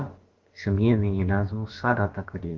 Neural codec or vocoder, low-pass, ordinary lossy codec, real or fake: codec, 16 kHz, about 1 kbps, DyCAST, with the encoder's durations; 7.2 kHz; Opus, 16 kbps; fake